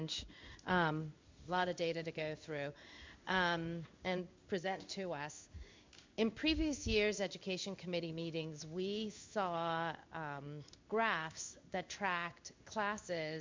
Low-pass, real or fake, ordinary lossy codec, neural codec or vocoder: 7.2 kHz; fake; MP3, 64 kbps; codec, 16 kHz in and 24 kHz out, 1 kbps, XY-Tokenizer